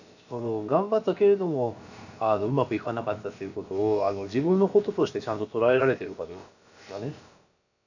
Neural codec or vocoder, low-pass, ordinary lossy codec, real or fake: codec, 16 kHz, about 1 kbps, DyCAST, with the encoder's durations; 7.2 kHz; none; fake